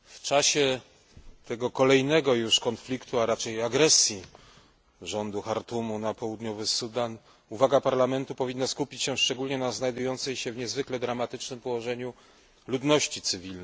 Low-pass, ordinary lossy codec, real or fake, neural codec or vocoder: none; none; real; none